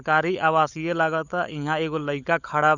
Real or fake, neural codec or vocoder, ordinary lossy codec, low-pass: fake; codec, 16 kHz, 16 kbps, FunCodec, trained on LibriTTS, 50 frames a second; none; 7.2 kHz